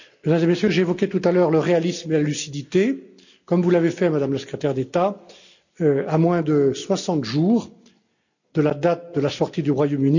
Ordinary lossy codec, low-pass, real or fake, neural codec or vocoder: none; 7.2 kHz; real; none